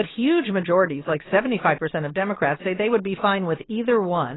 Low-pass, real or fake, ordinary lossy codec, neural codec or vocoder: 7.2 kHz; fake; AAC, 16 kbps; vocoder, 22.05 kHz, 80 mel bands, Vocos